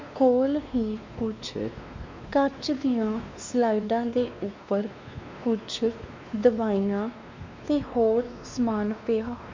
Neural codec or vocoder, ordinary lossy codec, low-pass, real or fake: codec, 16 kHz, 2 kbps, X-Codec, WavLM features, trained on Multilingual LibriSpeech; MP3, 64 kbps; 7.2 kHz; fake